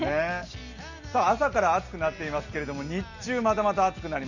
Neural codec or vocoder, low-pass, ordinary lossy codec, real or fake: none; 7.2 kHz; none; real